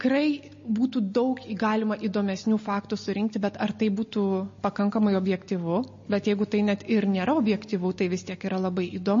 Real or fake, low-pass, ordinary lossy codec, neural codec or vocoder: real; 7.2 kHz; MP3, 32 kbps; none